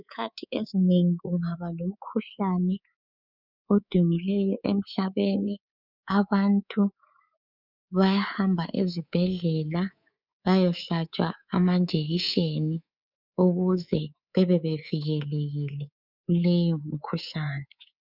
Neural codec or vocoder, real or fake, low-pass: codec, 24 kHz, 3.1 kbps, DualCodec; fake; 5.4 kHz